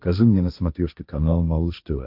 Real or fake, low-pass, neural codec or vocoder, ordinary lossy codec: fake; 5.4 kHz; codec, 16 kHz, 1.1 kbps, Voila-Tokenizer; AAC, 48 kbps